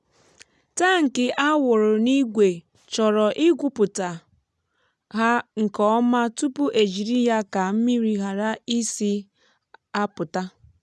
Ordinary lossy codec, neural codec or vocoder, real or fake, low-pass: none; none; real; none